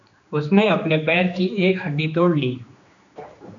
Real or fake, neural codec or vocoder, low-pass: fake; codec, 16 kHz, 2 kbps, X-Codec, HuBERT features, trained on general audio; 7.2 kHz